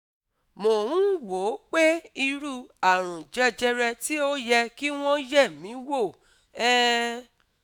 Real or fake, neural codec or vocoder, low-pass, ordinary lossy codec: fake; autoencoder, 48 kHz, 128 numbers a frame, DAC-VAE, trained on Japanese speech; none; none